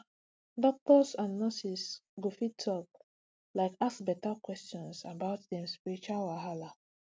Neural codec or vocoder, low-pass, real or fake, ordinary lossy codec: none; none; real; none